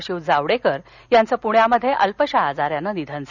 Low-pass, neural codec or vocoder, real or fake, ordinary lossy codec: 7.2 kHz; none; real; none